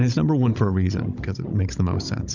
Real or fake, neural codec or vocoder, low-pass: fake; codec, 16 kHz, 16 kbps, FunCodec, trained on Chinese and English, 50 frames a second; 7.2 kHz